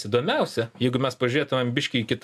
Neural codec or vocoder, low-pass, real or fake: none; 14.4 kHz; real